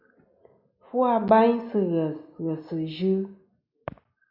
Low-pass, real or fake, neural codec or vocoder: 5.4 kHz; real; none